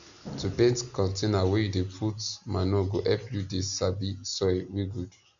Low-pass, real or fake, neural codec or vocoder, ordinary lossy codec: 7.2 kHz; real; none; none